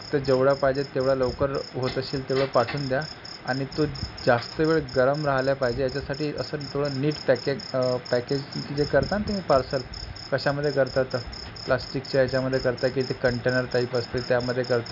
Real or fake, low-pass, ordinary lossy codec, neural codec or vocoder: real; 5.4 kHz; none; none